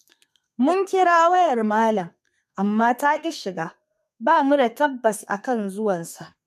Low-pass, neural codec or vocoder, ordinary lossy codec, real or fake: 14.4 kHz; codec, 32 kHz, 1.9 kbps, SNAC; MP3, 96 kbps; fake